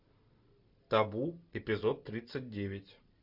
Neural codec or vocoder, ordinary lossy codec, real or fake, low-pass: none; MP3, 48 kbps; real; 5.4 kHz